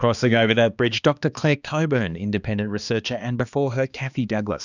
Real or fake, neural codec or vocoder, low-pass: fake; codec, 16 kHz, 2 kbps, X-Codec, HuBERT features, trained on balanced general audio; 7.2 kHz